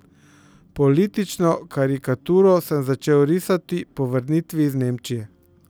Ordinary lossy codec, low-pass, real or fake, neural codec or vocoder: none; none; real; none